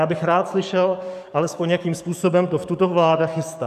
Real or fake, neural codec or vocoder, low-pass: fake; codec, 44.1 kHz, 7.8 kbps, DAC; 14.4 kHz